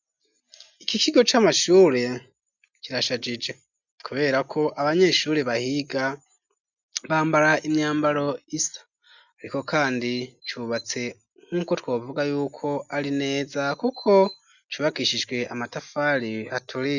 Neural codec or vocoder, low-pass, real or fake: none; 7.2 kHz; real